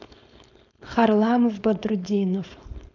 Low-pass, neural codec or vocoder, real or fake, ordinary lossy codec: 7.2 kHz; codec, 16 kHz, 4.8 kbps, FACodec; fake; none